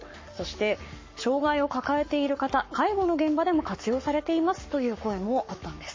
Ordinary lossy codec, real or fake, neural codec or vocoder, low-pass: MP3, 32 kbps; fake; codec, 44.1 kHz, 7.8 kbps, Pupu-Codec; 7.2 kHz